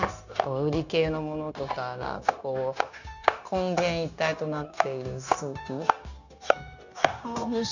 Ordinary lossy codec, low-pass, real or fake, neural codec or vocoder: none; 7.2 kHz; fake; codec, 16 kHz, 0.9 kbps, LongCat-Audio-Codec